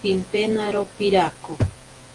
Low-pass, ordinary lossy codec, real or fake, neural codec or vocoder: 10.8 kHz; Opus, 24 kbps; fake; vocoder, 48 kHz, 128 mel bands, Vocos